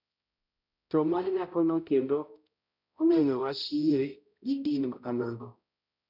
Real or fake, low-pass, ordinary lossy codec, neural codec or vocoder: fake; 5.4 kHz; none; codec, 16 kHz, 0.5 kbps, X-Codec, HuBERT features, trained on balanced general audio